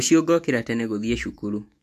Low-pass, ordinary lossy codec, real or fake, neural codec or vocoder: 14.4 kHz; MP3, 64 kbps; fake; autoencoder, 48 kHz, 128 numbers a frame, DAC-VAE, trained on Japanese speech